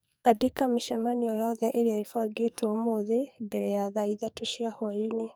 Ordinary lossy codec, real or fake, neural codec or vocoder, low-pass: none; fake; codec, 44.1 kHz, 2.6 kbps, SNAC; none